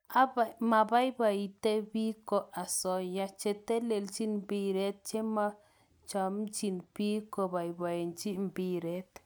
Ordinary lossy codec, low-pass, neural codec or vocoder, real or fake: none; none; none; real